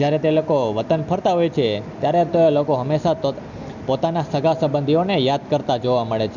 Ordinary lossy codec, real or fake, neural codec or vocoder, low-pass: none; real; none; 7.2 kHz